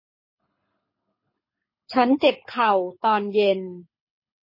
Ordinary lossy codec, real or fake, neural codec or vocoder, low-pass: MP3, 24 kbps; fake; codec, 24 kHz, 6 kbps, HILCodec; 5.4 kHz